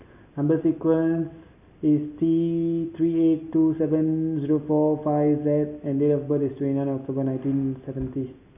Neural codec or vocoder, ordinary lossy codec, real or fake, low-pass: none; none; real; 3.6 kHz